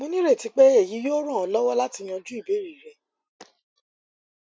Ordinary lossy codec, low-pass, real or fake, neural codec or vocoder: none; none; real; none